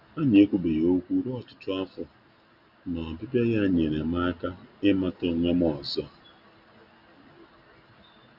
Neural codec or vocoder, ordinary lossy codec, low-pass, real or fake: none; none; 5.4 kHz; real